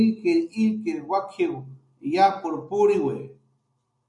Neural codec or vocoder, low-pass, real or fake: none; 10.8 kHz; real